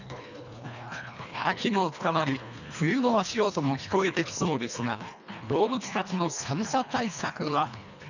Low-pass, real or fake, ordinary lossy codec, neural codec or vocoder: 7.2 kHz; fake; none; codec, 24 kHz, 1.5 kbps, HILCodec